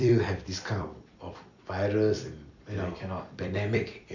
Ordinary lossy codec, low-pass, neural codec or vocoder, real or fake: none; 7.2 kHz; vocoder, 44.1 kHz, 128 mel bands every 256 samples, BigVGAN v2; fake